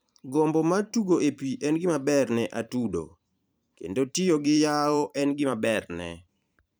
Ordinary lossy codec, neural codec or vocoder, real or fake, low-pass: none; vocoder, 44.1 kHz, 128 mel bands every 512 samples, BigVGAN v2; fake; none